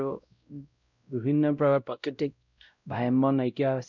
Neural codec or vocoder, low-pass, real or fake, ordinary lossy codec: codec, 16 kHz, 0.5 kbps, X-Codec, WavLM features, trained on Multilingual LibriSpeech; 7.2 kHz; fake; none